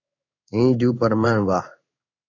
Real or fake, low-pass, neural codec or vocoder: fake; 7.2 kHz; codec, 16 kHz in and 24 kHz out, 1 kbps, XY-Tokenizer